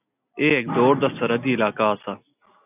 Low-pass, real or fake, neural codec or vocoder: 3.6 kHz; real; none